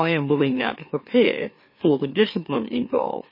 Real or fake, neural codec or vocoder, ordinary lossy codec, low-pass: fake; autoencoder, 44.1 kHz, a latent of 192 numbers a frame, MeloTTS; MP3, 24 kbps; 5.4 kHz